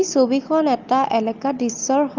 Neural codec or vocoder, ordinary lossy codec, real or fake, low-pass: none; Opus, 32 kbps; real; 7.2 kHz